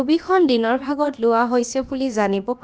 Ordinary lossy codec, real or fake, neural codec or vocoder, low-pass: none; fake; codec, 16 kHz, about 1 kbps, DyCAST, with the encoder's durations; none